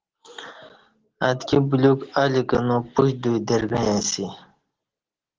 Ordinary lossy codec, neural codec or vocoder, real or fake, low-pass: Opus, 16 kbps; none; real; 7.2 kHz